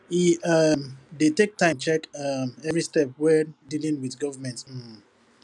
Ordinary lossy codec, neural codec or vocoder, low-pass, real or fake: none; none; 10.8 kHz; real